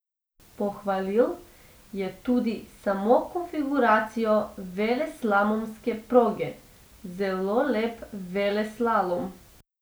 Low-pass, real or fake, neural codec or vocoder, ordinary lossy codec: none; real; none; none